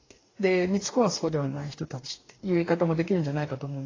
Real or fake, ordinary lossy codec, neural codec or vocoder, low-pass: fake; AAC, 32 kbps; codec, 44.1 kHz, 2.6 kbps, DAC; 7.2 kHz